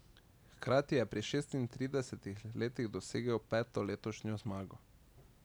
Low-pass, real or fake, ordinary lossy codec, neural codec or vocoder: none; real; none; none